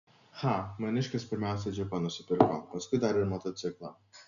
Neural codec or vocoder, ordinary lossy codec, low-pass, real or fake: none; MP3, 96 kbps; 7.2 kHz; real